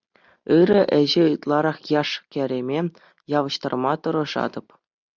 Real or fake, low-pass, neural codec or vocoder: real; 7.2 kHz; none